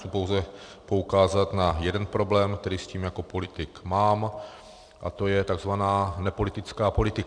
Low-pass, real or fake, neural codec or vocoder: 9.9 kHz; fake; vocoder, 44.1 kHz, 128 mel bands every 256 samples, BigVGAN v2